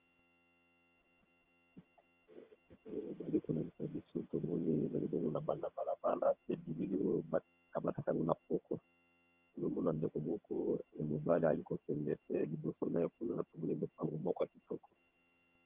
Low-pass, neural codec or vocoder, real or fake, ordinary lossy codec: 3.6 kHz; vocoder, 22.05 kHz, 80 mel bands, HiFi-GAN; fake; Opus, 24 kbps